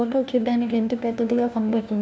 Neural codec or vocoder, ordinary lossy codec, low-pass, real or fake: codec, 16 kHz, 1 kbps, FunCodec, trained on LibriTTS, 50 frames a second; none; none; fake